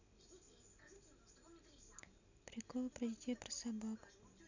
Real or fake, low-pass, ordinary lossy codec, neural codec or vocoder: real; 7.2 kHz; none; none